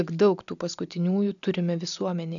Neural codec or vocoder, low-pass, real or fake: none; 7.2 kHz; real